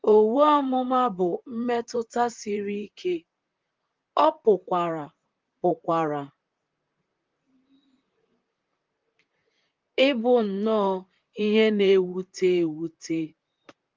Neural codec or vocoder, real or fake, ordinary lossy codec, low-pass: vocoder, 44.1 kHz, 128 mel bands, Pupu-Vocoder; fake; Opus, 32 kbps; 7.2 kHz